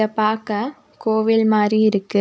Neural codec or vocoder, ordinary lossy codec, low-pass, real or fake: none; none; none; real